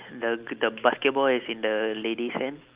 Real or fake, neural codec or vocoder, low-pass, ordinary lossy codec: real; none; 3.6 kHz; Opus, 32 kbps